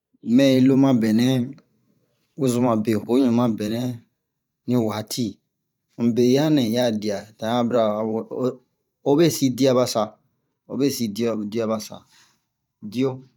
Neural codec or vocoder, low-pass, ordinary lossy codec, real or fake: vocoder, 44.1 kHz, 128 mel bands every 512 samples, BigVGAN v2; 19.8 kHz; none; fake